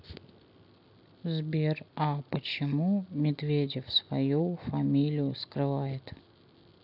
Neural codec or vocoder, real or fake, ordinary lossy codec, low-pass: none; real; none; 5.4 kHz